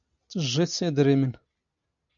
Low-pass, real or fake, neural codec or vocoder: 7.2 kHz; real; none